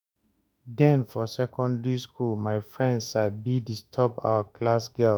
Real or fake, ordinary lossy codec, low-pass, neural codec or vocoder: fake; none; none; autoencoder, 48 kHz, 32 numbers a frame, DAC-VAE, trained on Japanese speech